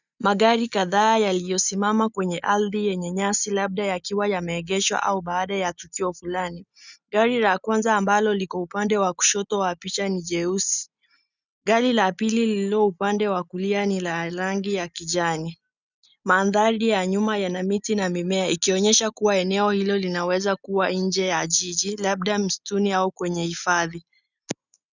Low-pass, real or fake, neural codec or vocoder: 7.2 kHz; real; none